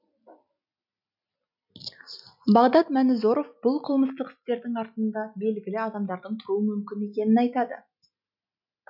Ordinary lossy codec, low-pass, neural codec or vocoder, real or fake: none; 5.4 kHz; none; real